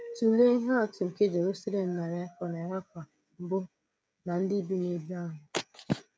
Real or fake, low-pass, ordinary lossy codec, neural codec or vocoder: fake; none; none; codec, 16 kHz, 16 kbps, FreqCodec, smaller model